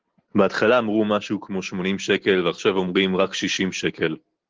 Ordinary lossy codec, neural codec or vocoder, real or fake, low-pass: Opus, 16 kbps; none; real; 7.2 kHz